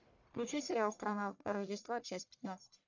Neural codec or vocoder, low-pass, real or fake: codec, 44.1 kHz, 1.7 kbps, Pupu-Codec; 7.2 kHz; fake